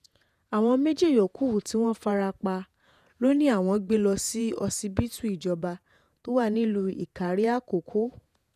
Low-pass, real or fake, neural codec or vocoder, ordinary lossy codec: 14.4 kHz; fake; vocoder, 48 kHz, 128 mel bands, Vocos; none